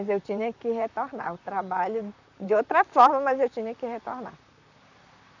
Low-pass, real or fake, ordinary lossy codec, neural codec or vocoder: 7.2 kHz; fake; none; vocoder, 44.1 kHz, 128 mel bands, Pupu-Vocoder